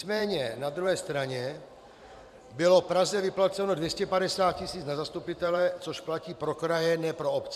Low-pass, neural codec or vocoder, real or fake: 14.4 kHz; vocoder, 44.1 kHz, 128 mel bands every 512 samples, BigVGAN v2; fake